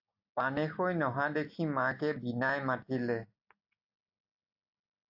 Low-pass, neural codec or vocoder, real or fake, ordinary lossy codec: 5.4 kHz; none; real; AAC, 48 kbps